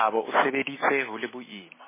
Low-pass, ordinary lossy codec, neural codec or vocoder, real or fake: 3.6 kHz; MP3, 16 kbps; none; real